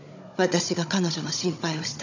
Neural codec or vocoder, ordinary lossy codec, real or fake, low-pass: codec, 16 kHz, 16 kbps, FunCodec, trained on Chinese and English, 50 frames a second; none; fake; 7.2 kHz